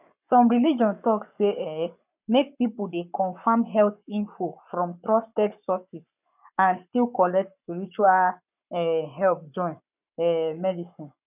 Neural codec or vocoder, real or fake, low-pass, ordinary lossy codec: codec, 44.1 kHz, 7.8 kbps, Pupu-Codec; fake; 3.6 kHz; none